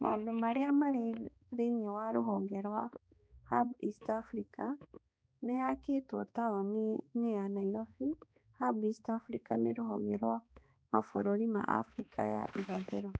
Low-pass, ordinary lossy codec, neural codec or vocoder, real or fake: none; none; codec, 16 kHz, 2 kbps, X-Codec, HuBERT features, trained on balanced general audio; fake